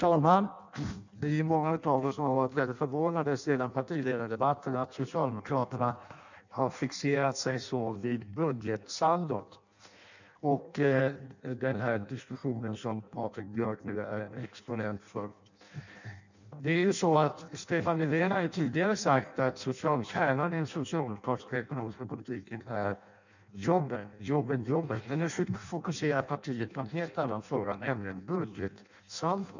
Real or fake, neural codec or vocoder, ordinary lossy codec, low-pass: fake; codec, 16 kHz in and 24 kHz out, 0.6 kbps, FireRedTTS-2 codec; none; 7.2 kHz